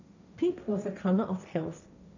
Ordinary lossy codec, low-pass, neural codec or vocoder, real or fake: none; 7.2 kHz; codec, 16 kHz, 1.1 kbps, Voila-Tokenizer; fake